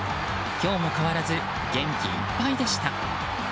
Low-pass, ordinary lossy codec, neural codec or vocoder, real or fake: none; none; none; real